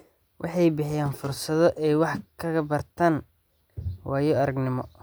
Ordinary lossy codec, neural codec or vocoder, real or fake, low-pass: none; none; real; none